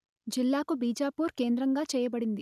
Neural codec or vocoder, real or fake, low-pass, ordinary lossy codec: none; real; 14.4 kHz; none